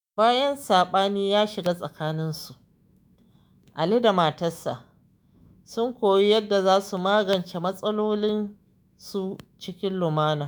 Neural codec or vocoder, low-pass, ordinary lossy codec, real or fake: autoencoder, 48 kHz, 128 numbers a frame, DAC-VAE, trained on Japanese speech; none; none; fake